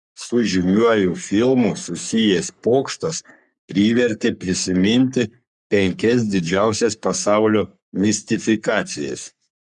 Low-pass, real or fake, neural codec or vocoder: 10.8 kHz; fake; codec, 44.1 kHz, 3.4 kbps, Pupu-Codec